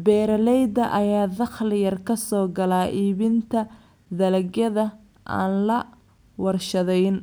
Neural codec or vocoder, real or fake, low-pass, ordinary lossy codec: none; real; none; none